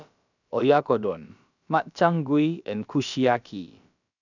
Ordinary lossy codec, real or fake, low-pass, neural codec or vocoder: none; fake; 7.2 kHz; codec, 16 kHz, about 1 kbps, DyCAST, with the encoder's durations